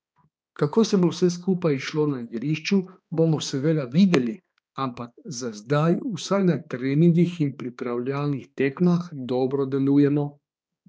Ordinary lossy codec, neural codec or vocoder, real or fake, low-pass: none; codec, 16 kHz, 2 kbps, X-Codec, HuBERT features, trained on balanced general audio; fake; none